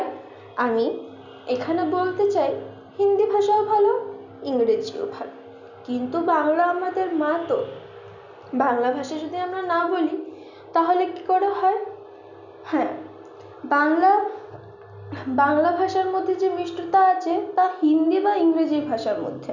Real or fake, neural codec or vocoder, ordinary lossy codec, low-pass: real; none; none; 7.2 kHz